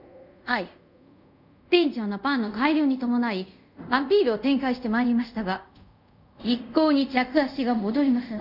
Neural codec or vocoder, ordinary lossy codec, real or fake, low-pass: codec, 24 kHz, 0.5 kbps, DualCodec; none; fake; 5.4 kHz